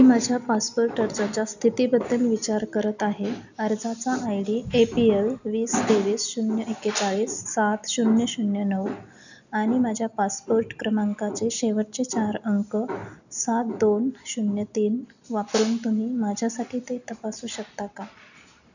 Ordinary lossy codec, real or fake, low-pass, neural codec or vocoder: none; real; 7.2 kHz; none